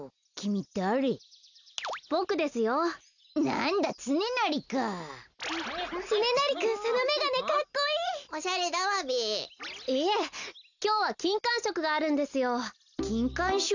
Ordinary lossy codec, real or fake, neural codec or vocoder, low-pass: none; real; none; 7.2 kHz